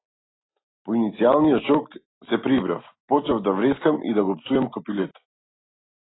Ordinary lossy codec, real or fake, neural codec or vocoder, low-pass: AAC, 16 kbps; real; none; 7.2 kHz